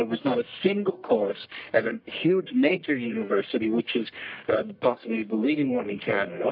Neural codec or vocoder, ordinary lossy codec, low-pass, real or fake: codec, 44.1 kHz, 1.7 kbps, Pupu-Codec; MP3, 48 kbps; 5.4 kHz; fake